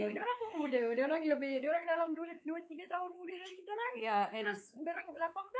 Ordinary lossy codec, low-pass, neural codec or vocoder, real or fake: none; none; codec, 16 kHz, 4 kbps, X-Codec, WavLM features, trained on Multilingual LibriSpeech; fake